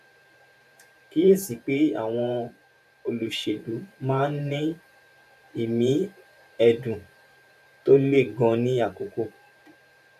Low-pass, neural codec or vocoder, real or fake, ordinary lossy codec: 14.4 kHz; vocoder, 44.1 kHz, 128 mel bands every 512 samples, BigVGAN v2; fake; none